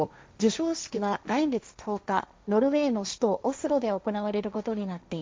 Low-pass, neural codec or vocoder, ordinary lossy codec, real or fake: none; codec, 16 kHz, 1.1 kbps, Voila-Tokenizer; none; fake